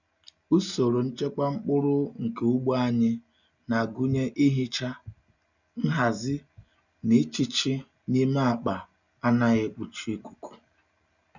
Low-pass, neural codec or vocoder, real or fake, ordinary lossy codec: 7.2 kHz; none; real; none